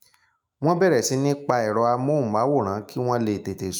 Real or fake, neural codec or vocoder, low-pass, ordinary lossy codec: fake; autoencoder, 48 kHz, 128 numbers a frame, DAC-VAE, trained on Japanese speech; none; none